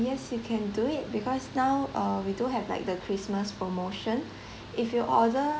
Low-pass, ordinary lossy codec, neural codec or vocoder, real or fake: none; none; none; real